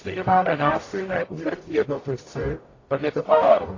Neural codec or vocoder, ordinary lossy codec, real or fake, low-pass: codec, 44.1 kHz, 0.9 kbps, DAC; AAC, 32 kbps; fake; 7.2 kHz